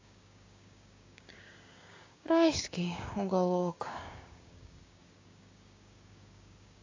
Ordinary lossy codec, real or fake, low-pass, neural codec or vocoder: AAC, 32 kbps; fake; 7.2 kHz; codec, 16 kHz, 6 kbps, DAC